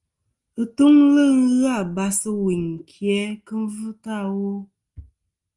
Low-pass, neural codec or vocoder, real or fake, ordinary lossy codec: 10.8 kHz; none; real; Opus, 24 kbps